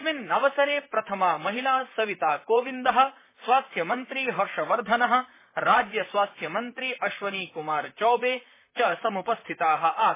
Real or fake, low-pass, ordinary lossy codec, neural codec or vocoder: fake; 3.6 kHz; MP3, 16 kbps; vocoder, 44.1 kHz, 128 mel bands, Pupu-Vocoder